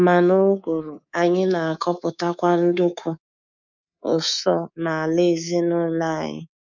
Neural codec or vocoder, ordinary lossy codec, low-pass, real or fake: codec, 24 kHz, 3.1 kbps, DualCodec; none; 7.2 kHz; fake